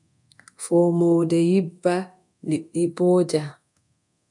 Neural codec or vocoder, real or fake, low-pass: codec, 24 kHz, 0.9 kbps, DualCodec; fake; 10.8 kHz